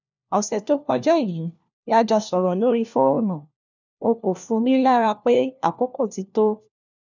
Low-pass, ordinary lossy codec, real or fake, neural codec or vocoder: 7.2 kHz; none; fake; codec, 16 kHz, 1 kbps, FunCodec, trained on LibriTTS, 50 frames a second